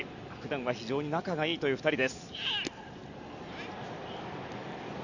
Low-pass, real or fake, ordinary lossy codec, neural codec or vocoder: 7.2 kHz; real; none; none